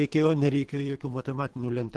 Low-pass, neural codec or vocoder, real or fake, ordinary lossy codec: 10.8 kHz; codec, 24 kHz, 3 kbps, HILCodec; fake; Opus, 16 kbps